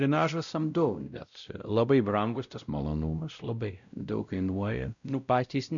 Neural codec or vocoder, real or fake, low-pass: codec, 16 kHz, 0.5 kbps, X-Codec, WavLM features, trained on Multilingual LibriSpeech; fake; 7.2 kHz